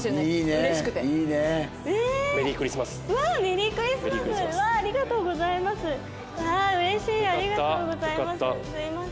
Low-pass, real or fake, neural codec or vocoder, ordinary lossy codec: none; real; none; none